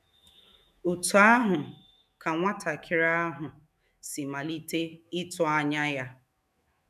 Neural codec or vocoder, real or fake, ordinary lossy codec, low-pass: autoencoder, 48 kHz, 128 numbers a frame, DAC-VAE, trained on Japanese speech; fake; none; 14.4 kHz